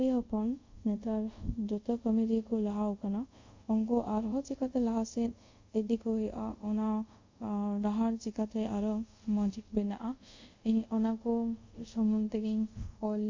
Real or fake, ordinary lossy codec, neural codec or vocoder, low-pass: fake; MP3, 48 kbps; codec, 24 kHz, 0.5 kbps, DualCodec; 7.2 kHz